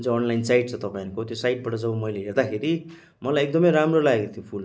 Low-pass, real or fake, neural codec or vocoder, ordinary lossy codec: none; real; none; none